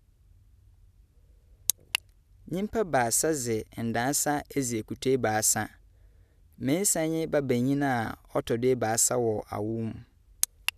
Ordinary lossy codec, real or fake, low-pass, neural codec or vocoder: none; real; 14.4 kHz; none